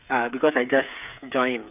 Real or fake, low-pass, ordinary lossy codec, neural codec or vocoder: fake; 3.6 kHz; none; codec, 16 kHz, 8 kbps, FreqCodec, smaller model